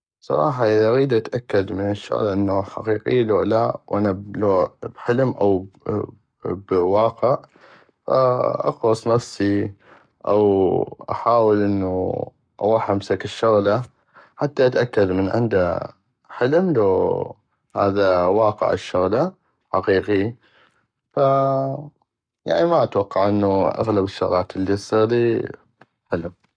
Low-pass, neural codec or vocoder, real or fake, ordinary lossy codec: 9.9 kHz; codec, 44.1 kHz, 7.8 kbps, Pupu-Codec; fake; none